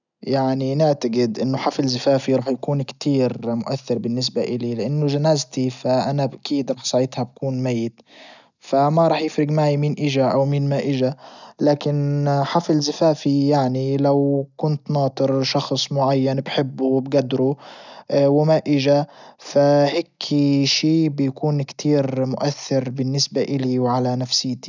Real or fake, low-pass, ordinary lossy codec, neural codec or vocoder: real; 7.2 kHz; none; none